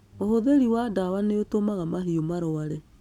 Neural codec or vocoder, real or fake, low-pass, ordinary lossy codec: none; real; 19.8 kHz; none